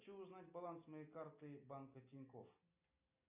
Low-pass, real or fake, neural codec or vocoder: 3.6 kHz; real; none